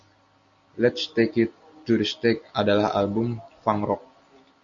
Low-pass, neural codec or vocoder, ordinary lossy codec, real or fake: 7.2 kHz; none; Opus, 64 kbps; real